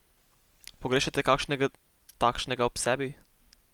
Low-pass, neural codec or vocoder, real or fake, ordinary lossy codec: 19.8 kHz; none; real; Opus, 32 kbps